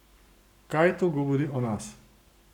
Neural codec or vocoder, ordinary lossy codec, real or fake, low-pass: codec, 44.1 kHz, 7.8 kbps, Pupu-Codec; none; fake; 19.8 kHz